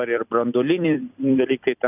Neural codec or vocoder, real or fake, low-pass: vocoder, 44.1 kHz, 128 mel bands every 256 samples, BigVGAN v2; fake; 3.6 kHz